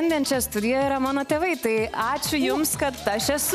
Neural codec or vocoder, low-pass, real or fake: none; 14.4 kHz; real